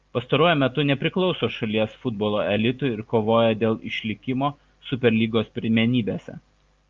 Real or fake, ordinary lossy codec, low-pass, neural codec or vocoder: real; Opus, 24 kbps; 7.2 kHz; none